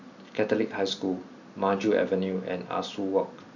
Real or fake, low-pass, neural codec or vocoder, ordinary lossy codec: real; 7.2 kHz; none; none